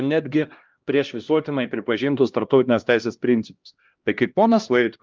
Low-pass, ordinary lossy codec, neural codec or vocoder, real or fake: 7.2 kHz; Opus, 24 kbps; codec, 16 kHz, 1 kbps, X-Codec, HuBERT features, trained on LibriSpeech; fake